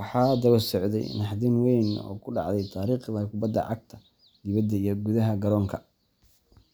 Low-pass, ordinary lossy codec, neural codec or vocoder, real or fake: none; none; none; real